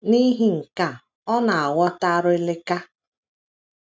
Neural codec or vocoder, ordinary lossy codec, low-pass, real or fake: none; none; none; real